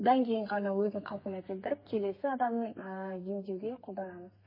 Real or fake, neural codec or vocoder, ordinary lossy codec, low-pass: fake; codec, 32 kHz, 1.9 kbps, SNAC; MP3, 24 kbps; 5.4 kHz